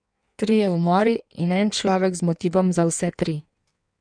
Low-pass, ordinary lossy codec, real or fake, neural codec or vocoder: 9.9 kHz; none; fake; codec, 16 kHz in and 24 kHz out, 1.1 kbps, FireRedTTS-2 codec